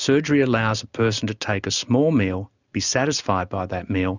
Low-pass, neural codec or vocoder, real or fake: 7.2 kHz; none; real